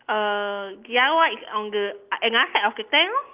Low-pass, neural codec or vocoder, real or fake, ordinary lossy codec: 3.6 kHz; none; real; Opus, 32 kbps